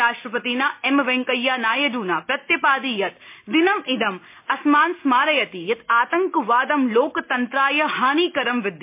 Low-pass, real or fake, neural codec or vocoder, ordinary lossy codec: 3.6 kHz; real; none; MP3, 24 kbps